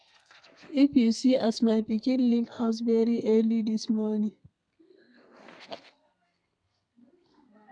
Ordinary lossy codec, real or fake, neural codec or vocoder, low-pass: MP3, 96 kbps; fake; codec, 32 kHz, 1.9 kbps, SNAC; 9.9 kHz